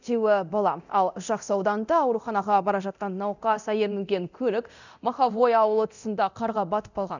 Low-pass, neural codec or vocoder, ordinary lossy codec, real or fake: 7.2 kHz; codec, 24 kHz, 0.9 kbps, DualCodec; none; fake